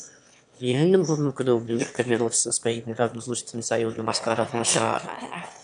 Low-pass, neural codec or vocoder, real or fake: 9.9 kHz; autoencoder, 22.05 kHz, a latent of 192 numbers a frame, VITS, trained on one speaker; fake